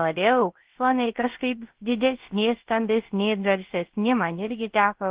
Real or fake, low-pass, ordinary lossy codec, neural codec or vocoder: fake; 3.6 kHz; Opus, 16 kbps; codec, 16 kHz, 0.3 kbps, FocalCodec